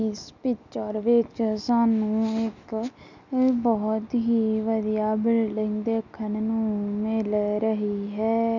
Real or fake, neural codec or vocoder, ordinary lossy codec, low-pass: real; none; none; 7.2 kHz